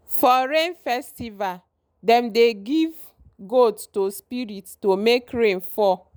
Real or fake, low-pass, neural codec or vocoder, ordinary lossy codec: real; none; none; none